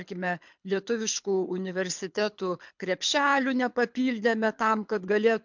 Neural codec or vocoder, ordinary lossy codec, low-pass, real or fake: codec, 24 kHz, 6 kbps, HILCodec; MP3, 64 kbps; 7.2 kHz; fake